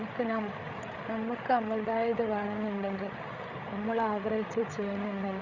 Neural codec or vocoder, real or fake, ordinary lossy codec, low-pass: codec, 16 kHz, 8 kbps, FreqCodec, larger model; fake; Opus, 64 kbps; 7.2 kHz